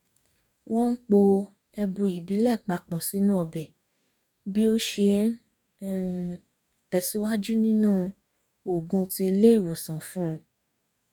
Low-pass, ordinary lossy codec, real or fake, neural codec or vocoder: 19.8 kHz; none; fake; codec, 44.1 kHz, 2.6 kbps, DAC